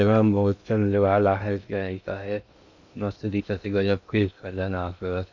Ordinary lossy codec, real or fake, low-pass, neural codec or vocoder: none; fake; 7.2 kHz; codec, 16 kHz in and 24 kHz out, 0.6 kbps, FocalCodec, streaming, 2048 codes